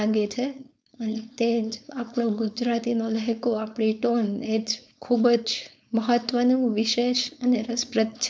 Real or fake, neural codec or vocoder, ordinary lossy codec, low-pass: fake; codec, 16 kHz, 4.8 kbps, FACodec; none; none